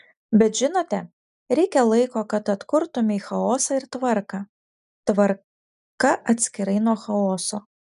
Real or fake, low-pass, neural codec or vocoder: real; 10.8 kHz; none